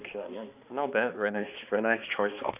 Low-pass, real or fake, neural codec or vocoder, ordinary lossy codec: 3.6 kHz; fake; codec, 16 kHz, 2 kbps, X-Codec, HuBERT features, trained on balanced general audio; none